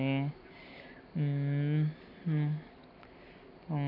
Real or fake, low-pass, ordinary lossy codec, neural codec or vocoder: real; 5.4 kHz; none; none